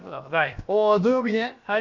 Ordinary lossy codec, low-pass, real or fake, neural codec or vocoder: Opus, 64 kbps; 7.2 kHz; fake; codec, 16 kHz, about 1 kbps, DyCAST, with the encoder's durations